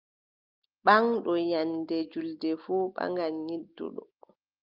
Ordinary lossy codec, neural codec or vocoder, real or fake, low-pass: Opus, 32 kbps; none; real; 5.4 kHz